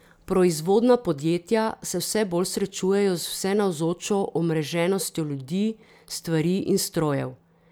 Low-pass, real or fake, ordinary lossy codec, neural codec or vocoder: none; real; none; none